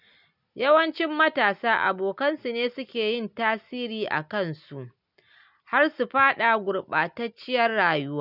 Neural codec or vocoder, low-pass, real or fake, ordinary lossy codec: none; 5.4 kHz; real; none